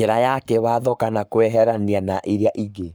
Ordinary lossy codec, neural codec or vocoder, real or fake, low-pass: none; codec, 44.1 kHz, 7.8 kbps, Pupu-Codec; fake; none